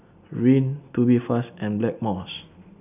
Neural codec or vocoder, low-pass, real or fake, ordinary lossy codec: none; 3.6 kHz; real; none